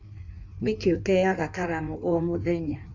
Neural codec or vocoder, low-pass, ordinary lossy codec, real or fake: codec, 16 kHz in and 24 kHz out, 1.1 kbps, FireRedTTS-2 codec; 7.2 kHz; MP3, 64 kbps; fake